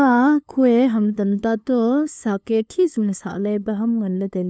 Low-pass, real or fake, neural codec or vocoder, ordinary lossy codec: none; fake; codec, 16 kHz, 2 kbps, FunCodec, trained on LibriTTS, 25 frames a second; none